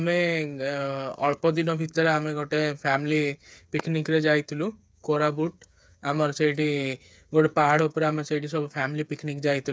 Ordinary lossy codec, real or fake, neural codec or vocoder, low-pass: none; fake; codec, 16 kHz, 8 kbps, FreqCodec, smaller model; none